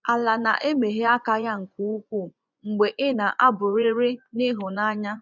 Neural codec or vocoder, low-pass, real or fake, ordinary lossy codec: vocoder, 24 kHz, 100 mel bands, Vocos; 7.2 kHz; fake; none